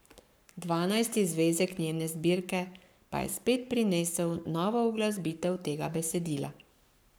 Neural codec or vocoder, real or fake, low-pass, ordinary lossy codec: codec, 44.1 kHz, 7.8 kbps, Pupu-Codec; fake; none; none